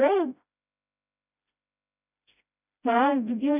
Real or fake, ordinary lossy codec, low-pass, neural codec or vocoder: fake; none; 3.6 kHz; codec, 16 kHz, 0.5 kbps, FreqCodec, smaller model